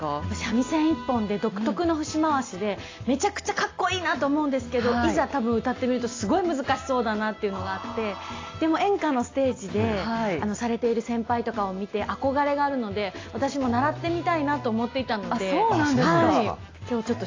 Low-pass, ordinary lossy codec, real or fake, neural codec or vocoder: 7.2 kHz; AAC, 32 kbps; real; none